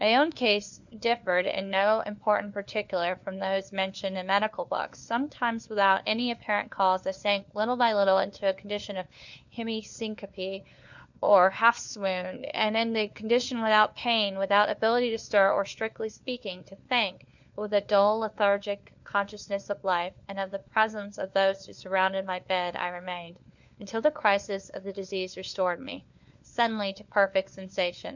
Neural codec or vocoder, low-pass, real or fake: codec, 16 kHz, 4 kbps, FunCodec, trained on LibriTTS, 50 frames a second; 7.2 kHz; fake